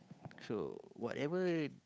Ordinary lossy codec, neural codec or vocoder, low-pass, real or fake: none; codec, 16 kHz, 8 kbps, FunCodec, trained on Chinese and English, 25 frames a second; none; fake